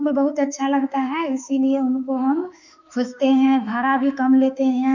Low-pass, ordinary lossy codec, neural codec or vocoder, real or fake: 7.2 kHz; none; autoencoder, 48 kHz, 32 numbers a frame, DAC-VAE, trained on Japanese speech; fake